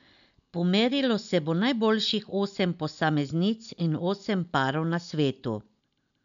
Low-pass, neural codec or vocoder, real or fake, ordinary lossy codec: 7.2 kHz; none; real; none